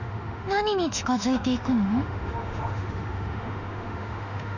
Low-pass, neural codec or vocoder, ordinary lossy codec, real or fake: 7.2 kHz; autoencoder, 48 kHz, 32 numbers a frame, DAC-VAE, trained on Japanese speech; none; fake